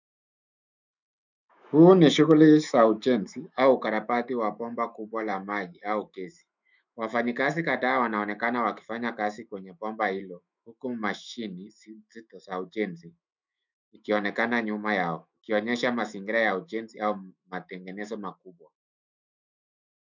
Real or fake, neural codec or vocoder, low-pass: fake; autoencoder, 48 kHz, 128 numbers a frame, DAC-VAE, trained on Japanese speech; 7.2 kHz